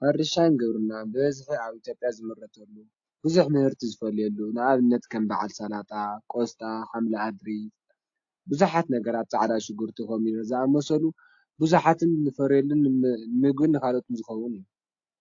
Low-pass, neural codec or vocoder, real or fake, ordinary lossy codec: 7.2 kHz; none; real; AAC, 48 kbps